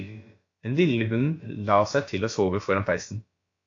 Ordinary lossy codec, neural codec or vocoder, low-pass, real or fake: AAC, 48 kbps; codec, 16 kHz, about 1 kbps, DyCAST, with the encoder's durations; 7.2 kHz; fake